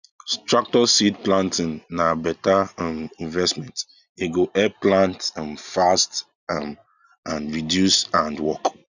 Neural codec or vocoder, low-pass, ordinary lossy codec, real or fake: none; 7.2 kHz; none; real